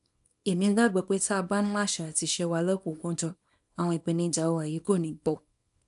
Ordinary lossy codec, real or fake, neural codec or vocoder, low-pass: none; fake; codec, 24 kHz, 0.9 kbps, WavTokenizer, small release; 10.8 kHz